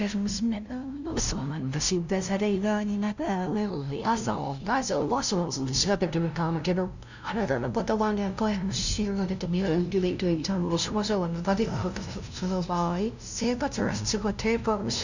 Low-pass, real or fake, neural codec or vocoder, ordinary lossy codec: 7.2 kHz; fake; codec, 16 kHz, 0.5 kbps, FunCodec, trained on LibriTTS, 25 frames a second; none